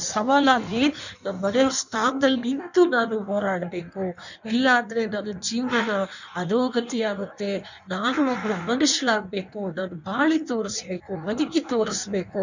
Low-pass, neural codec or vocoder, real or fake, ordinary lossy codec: 7.2 kHz; codec, 16 kHz in and 24 kHz out, 1.1 kbps, FireRedTTS-2 codec; fake; none